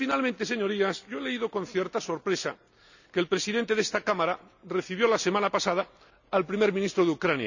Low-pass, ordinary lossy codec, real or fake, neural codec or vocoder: 7.2 kHz; none; real; none